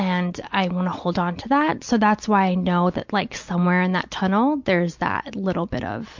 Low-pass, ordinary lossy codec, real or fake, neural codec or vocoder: 7.2 kHz; MP3, 64 kbps; real; none